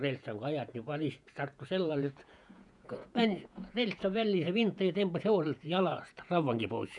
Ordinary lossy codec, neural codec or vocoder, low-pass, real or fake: none; vocoder, 48 kHz, 128 mel bands, Vocos; 10.8 kHz; fake